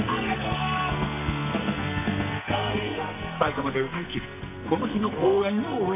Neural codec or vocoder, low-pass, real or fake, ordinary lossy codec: codec, 44.1 kHz, 2.6 kbps, SNAC; 3.6 kHz; fake; MP3, 32 kbps